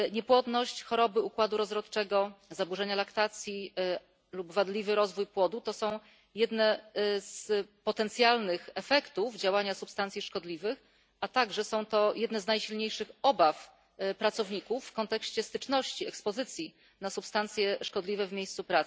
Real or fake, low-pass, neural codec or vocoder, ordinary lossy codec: real; none; none; none